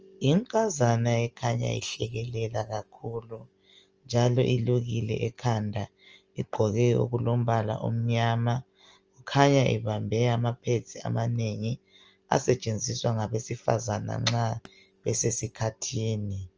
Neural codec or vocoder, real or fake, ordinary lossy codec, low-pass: none; real; Opus, 24 kbps; 7.2 kHz